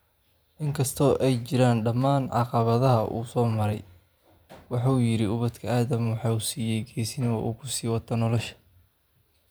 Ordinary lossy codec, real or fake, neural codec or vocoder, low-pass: none; fake; vocoder, 44.1 kHz, 128 mel bands every 512 samples, BigVGAN v2; none